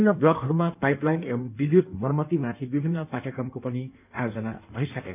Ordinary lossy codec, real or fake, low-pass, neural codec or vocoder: none; fake; 3.6 kHz; codec, 16 kHz in and 24 kHz out, 1.1 kbps, FireRedTTS-2 codec